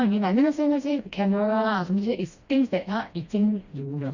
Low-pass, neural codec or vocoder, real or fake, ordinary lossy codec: 7.2 kHz; codec, 16 kHz, 1 kbps, FreqCodec, smaller model; fake; Opus, 64 kbps